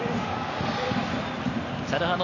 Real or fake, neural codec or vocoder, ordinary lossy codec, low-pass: real; none; none; 7.2 kHz